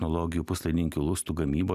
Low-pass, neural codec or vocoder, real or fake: 14.4 kHz; none; real